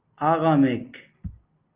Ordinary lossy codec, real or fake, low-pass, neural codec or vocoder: Opus, 32 kbps; real; 3.6 kHz; none